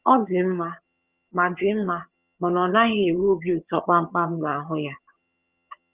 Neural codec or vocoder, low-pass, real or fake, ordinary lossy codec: vocoder, 22.05 kHz, 80 mel bands, HiFi-GAN; 3.6 kHz; fake; Opus, 32 kbps